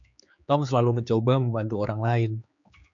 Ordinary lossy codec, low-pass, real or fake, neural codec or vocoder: MP3, 96 kbps; 7.2 kHz; fake; codec, 16 kHz, 4 kbps, X-Codec, HuBERT features, trained on general audio